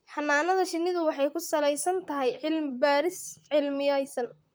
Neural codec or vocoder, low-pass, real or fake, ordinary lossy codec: vocoder, 44.1 kHz, 128 mel bands, Pupu-Vocoder; none; fake; none